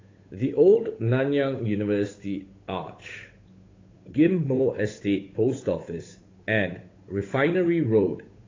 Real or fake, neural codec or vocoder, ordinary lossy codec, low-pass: fake; codec, 16 kHz, 8 kbps, FunCodec, trained on Chinese and English, 25 frames a second; AAC, 32 kbps; 7.2 kHz